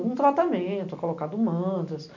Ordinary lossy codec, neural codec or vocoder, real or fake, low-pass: none; none; real; 7.2 kHz